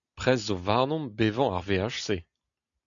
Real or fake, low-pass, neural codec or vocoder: real; 7.2 kHz; none